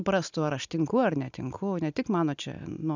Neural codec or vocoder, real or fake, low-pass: none; real; 7.2 kHz